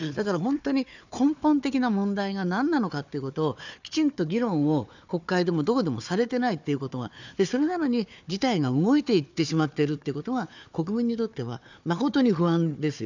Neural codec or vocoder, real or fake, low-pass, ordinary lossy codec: codec, 16 kHz, 4 kbps, FunCodec, trained on Chinese and English, 50 frames a second; fake; 7.2 kHz; none